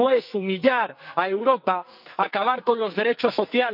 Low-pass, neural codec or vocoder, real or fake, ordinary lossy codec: 5.4 kHz; codec, 44.1 kHz, 2.6 kbps, SNAC; fake; none